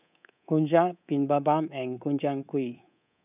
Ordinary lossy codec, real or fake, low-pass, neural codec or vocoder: none; fake; 3.6 kHz; codec, 24 kHz, 3.1 kbps, DualCodec